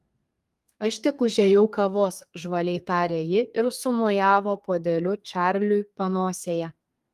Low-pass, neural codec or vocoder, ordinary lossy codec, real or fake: 14.4 kHz; codec, 32 kHz, 1.9 kbps, SNAC; Opus, 32 kbps; fake